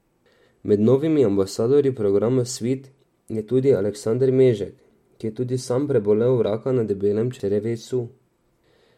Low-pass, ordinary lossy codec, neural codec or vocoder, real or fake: 19.8 kHz; MP3, 64 kbps; none; real